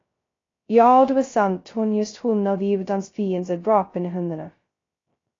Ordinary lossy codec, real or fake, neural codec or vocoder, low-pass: AAC, 32 kbps; fake; codec, 16 kHz, 0.2 kbps, FocalCodec; 7.2 kHz